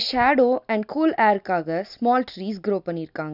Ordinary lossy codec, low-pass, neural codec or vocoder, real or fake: none; 5.4 kHz; none; real